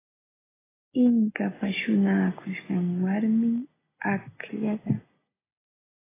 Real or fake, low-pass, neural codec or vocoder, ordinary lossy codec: real; 3.6 kHz; none; AAC, 16 kbps